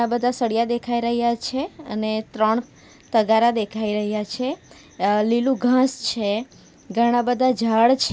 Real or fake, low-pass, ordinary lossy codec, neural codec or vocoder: real; none; none; none